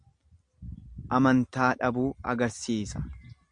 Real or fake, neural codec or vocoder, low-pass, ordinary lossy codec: real; none; 9.9 kHz; MP3, 64 kbps